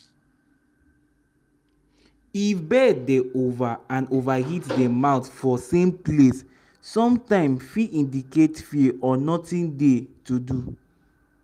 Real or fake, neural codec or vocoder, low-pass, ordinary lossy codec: real; none; 14.4 kHz; Opus, 32 kbps